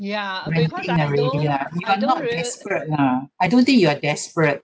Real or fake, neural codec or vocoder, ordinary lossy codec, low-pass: real; none; none; none